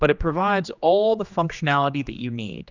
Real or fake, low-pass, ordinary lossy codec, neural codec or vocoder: fake; 7.2 kHz; Opus, 64 kbps; codec, 16 kHz, 2 kbps, X-Codec, HuBERT features, trained on general audio